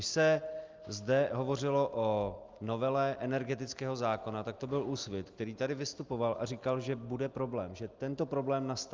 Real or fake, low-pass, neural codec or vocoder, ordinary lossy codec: real; 7.2 kHz; none; Opus, 24 kbps